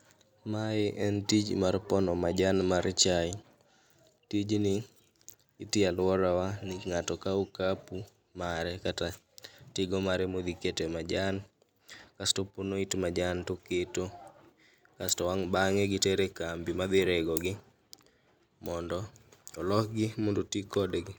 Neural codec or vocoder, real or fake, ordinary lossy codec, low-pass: none; real; none; none